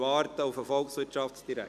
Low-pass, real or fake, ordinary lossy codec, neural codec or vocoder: 14.4 kHz; real; none; none